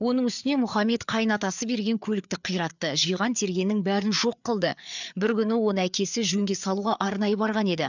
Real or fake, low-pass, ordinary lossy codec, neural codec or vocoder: fake; 7.2 kHz; none; codec, 16 kHz, 4 kbps, FreqCodec, larger model